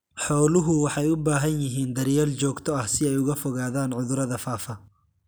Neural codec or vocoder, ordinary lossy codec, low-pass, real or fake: none; none; none; real